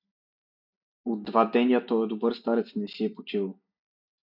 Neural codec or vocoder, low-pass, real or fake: none; 5.4 kHz; real